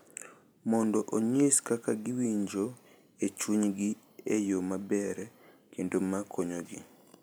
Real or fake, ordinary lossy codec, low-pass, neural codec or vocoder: real; none; none; none